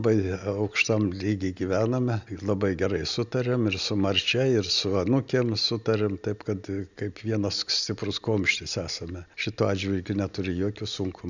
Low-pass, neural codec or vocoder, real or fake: 7.2 kHz; none; real